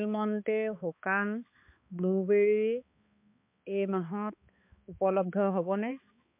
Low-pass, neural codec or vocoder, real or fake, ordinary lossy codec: 3.6 kHz; codec, 16 kHz, 2 kbps, X-Codec, HuBERT features, trained on balanced general audio; fake; MP3, 32 kbps